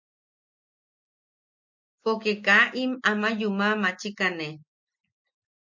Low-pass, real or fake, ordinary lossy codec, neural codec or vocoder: 7.2 kHz; real; MP3, 64 kbps; none